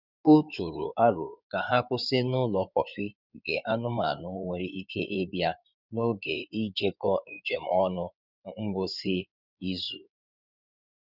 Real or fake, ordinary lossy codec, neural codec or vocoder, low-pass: fake; none; codec, 16 kHz in and 24 kHz out, 2.2 kbps, FireRedTTS-2 codec; 5.4 kHz